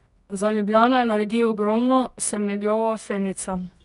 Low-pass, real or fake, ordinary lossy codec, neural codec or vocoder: 10.8 kHz; fake; none; codec, 24 kHz, 0.9 kbps, WavTokenizer, medium music audio release